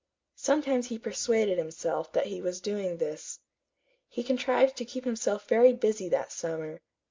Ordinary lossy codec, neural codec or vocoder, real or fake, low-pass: MP3, 64 kbps; none; real; 7.2 kHz